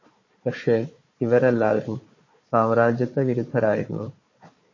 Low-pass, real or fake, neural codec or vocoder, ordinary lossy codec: 7.2 kHz; fake; codec, 16 kHz, 4 kbps, FunCodec, trained on Chinese and English, 50 frames a second; MP3, 32 kbps